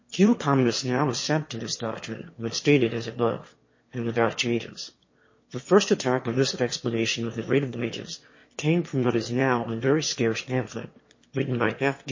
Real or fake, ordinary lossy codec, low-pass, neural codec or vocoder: fake; MP3, 32 kbps; 7.2 kHz; autoencoder, 22.05 kHz, a latent of 192 numbers a frame, VITS, trained on one speaker